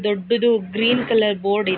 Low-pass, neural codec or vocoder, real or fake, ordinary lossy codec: 5.4 kHz; none; real; none